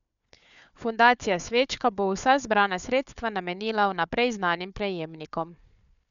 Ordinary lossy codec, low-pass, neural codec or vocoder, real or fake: none; 7.2 kHz; codec, 16 kHz, 4 kbps, FunCodec, trained on Chinese and English, 50 frames a second; fake